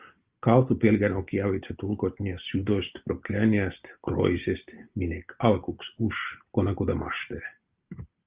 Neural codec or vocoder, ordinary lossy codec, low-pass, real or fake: none; Opus, 32 kbps; 3.6 kHz; real